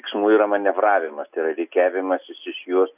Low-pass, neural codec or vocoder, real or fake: 3.6 kHz; none; real